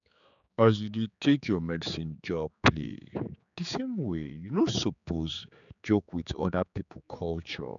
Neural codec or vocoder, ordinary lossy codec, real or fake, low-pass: codec, 16 kHz, 4 kbps, X-Codec, HuBERT features, trained on general audio; none; fake; 7.2 kHz